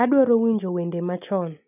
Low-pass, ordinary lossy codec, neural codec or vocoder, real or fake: 3.6 kHz; none; none; real